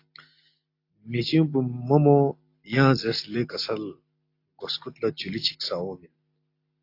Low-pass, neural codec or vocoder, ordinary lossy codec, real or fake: 5.4 kHz; none; AAC, 32 kbps; real